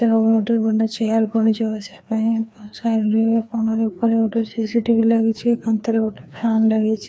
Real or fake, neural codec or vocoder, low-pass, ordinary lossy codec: fake; codec, 16 kHz, 2 kbps, FreqCodec, larger model; none; none